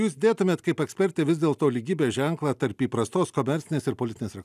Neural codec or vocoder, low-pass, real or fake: none; 14.4 kHz; real